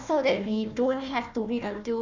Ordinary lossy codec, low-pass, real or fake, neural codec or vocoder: none; 7.2 kHz; fake; codec, 16 kHz, 1 kbps, FunCodec, trained on Chinese and English, 50 frames a second